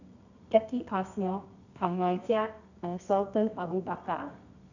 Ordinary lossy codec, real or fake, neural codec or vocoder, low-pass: none; fake; codec, 24 kHz, 0.9 kbps, WavTokenizer, medium music audio release; 7.2 kHz